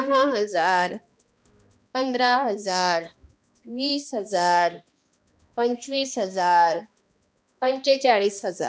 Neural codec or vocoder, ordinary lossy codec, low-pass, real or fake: codec, 16 kHz, 1 kbps, X-Codec, HuBERT features, trained on balanced general audio; none; none; fake